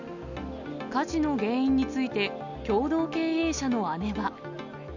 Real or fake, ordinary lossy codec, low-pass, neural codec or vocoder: real; none; 7.2 kHz; none